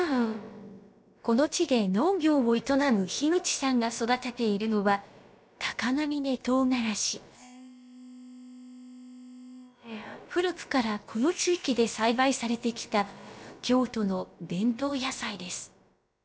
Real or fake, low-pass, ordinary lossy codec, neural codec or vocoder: fake; none; none; codec, 16 kHz, about 1 kbps, DyCAST, with the encoder's durations